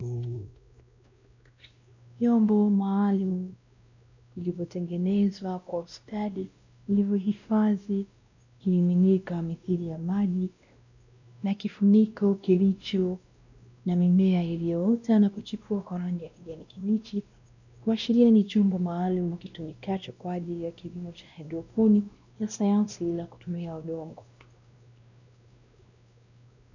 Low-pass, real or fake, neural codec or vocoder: 7.2 kHz; fake; codec, 16 kHz, 1 kbps, X-Codec, WavLM features, trained on Multilingual LibriSpeech